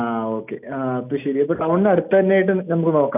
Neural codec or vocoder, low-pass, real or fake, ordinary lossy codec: none; 3.6 kHz; real; none